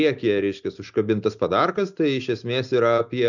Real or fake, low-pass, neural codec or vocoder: real; 7.2 kHz; none